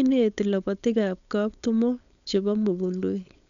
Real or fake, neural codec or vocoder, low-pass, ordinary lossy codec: fake; codec, 16 kHz, 4.8 kbps, FACodec; 7.2 kHz; MP3, 96 kbps